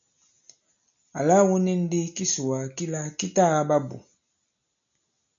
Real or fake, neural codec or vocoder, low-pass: real; none; 7.2 kHz